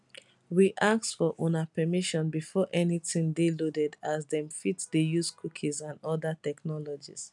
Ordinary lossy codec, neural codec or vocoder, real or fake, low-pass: none; none; real; 10.8 kHz